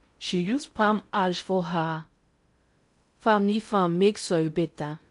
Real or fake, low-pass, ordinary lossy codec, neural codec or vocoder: fake; 10.8 kHz; MP3, 64 kbps; codec, 16 kHz in and 24 kHz out, 0.6 kbps, FocalCodec, streaming, 4096 codes